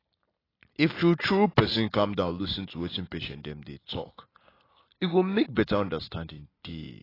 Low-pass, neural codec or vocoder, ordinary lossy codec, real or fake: 5.4 kHz; none; AAC, 24 kbps; real